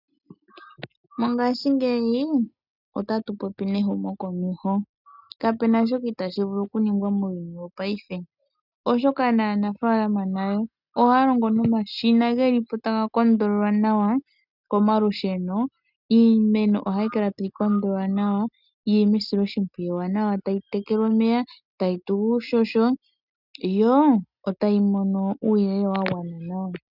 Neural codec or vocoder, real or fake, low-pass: none; real; 5.4 kHz